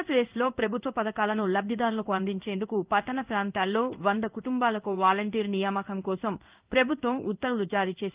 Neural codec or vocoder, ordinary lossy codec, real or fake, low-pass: codec, 16 kHz in and 24 kHz out, 1 kbps, XY-Tokenizer; Opus, 32 kbps; fake; 3.6 kHz